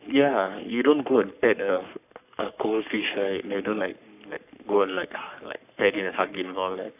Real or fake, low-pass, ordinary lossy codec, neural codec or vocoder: fake; 3.6 kHz; AAC, 32 kbps; codec, 44.1 kHz, 3.4 kbps, Pupu-Codec